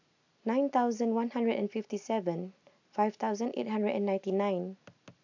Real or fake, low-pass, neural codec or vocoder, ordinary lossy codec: real; 7.2 kHz; none; AAC, 48 kbps